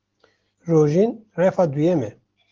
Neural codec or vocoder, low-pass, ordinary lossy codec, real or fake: none; 7.2 kHz; Opus, 16 kbps; real